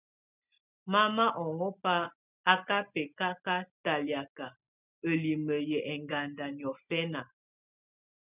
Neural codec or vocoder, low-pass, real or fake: none; 3.6 kHz; real